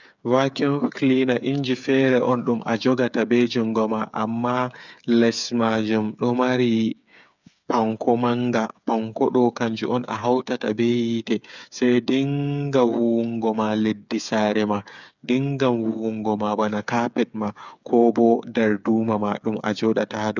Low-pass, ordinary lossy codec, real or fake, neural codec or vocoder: 7.2 kHz; none; fake; codec, 16 kHz, 8 kbps, FreqCodec, smaller model